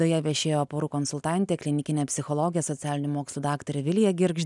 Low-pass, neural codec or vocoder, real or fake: 10.8 kHz; none; real